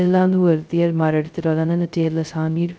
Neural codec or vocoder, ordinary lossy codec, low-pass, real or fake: codec, 16 kHz, 0.2 kbps, FocalCodec; none; none; fake